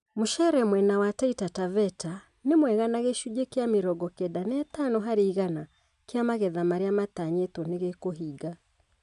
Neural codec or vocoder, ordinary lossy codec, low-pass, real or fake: none; none; 10.8 kHz; real